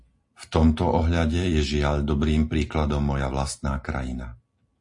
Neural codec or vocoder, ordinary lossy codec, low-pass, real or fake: none; MP3, 48 kbps; 10.8 kHz; real